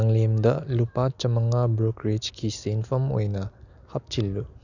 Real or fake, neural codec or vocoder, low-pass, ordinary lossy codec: real; none; 7.2 kHz; none